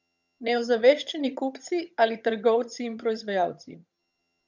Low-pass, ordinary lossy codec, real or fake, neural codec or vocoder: 7.2 kHz; none; fake; vocoder, 22.05 kHz, 80 mel bands, HiFi-GAN